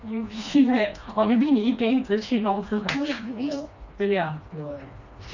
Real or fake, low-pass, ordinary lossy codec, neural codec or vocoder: fake; 7.2 kHz; Opus, 64 kbps; codec, 16 kHz, 2 kbps, FreqCodec, smaller model